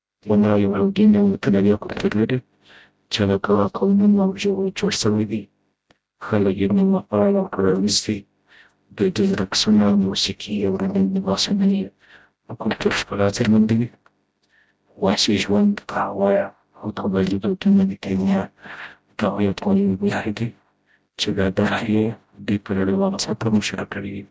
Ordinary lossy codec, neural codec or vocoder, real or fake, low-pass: none; codec, 16 kHz, 0.5 kbps, FreqCodec, smaller model; fake; none